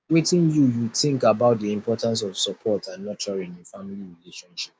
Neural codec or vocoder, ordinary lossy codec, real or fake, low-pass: codec, 16 kHz, 6 kbps, DAC; none; fake; none